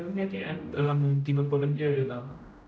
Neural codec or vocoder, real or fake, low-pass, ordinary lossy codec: codec, 16 kHz, 0.5 kbps, X-Codec, HuBERT features, trained on general audio; fake; none; none